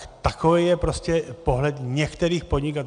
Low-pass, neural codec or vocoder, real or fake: 9.9 kHz; none; real